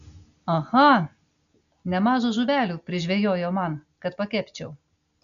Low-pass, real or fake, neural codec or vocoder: 7.2 kHz; real; none